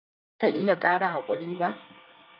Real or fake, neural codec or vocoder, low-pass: fake; codec, 24 kHz, 1 kbps, SNAC; 5.4 kHz